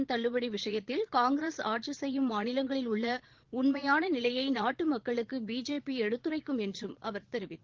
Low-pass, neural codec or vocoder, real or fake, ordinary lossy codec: 7.2 kHz; vocoder, 22.05 kHz, 80 mel bands, Vocos; fake; Opus, 16 kbps